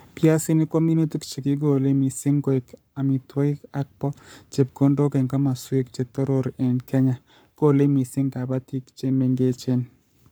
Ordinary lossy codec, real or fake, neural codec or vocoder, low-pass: none; fake; codec, 44.1 kHz, 7.8 kbps, Pupu-Codec; none